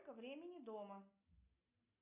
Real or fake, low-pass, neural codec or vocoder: real; 3.6 kHz; none